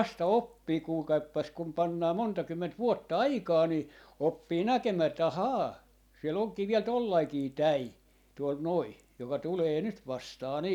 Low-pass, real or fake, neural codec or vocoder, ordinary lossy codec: 19.8 kHz; real; none; none